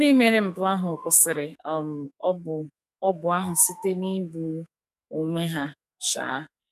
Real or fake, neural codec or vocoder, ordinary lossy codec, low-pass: fake; codec, 44.1 kHz, 2.6 kbps, SNAC; none; 14.4 kHz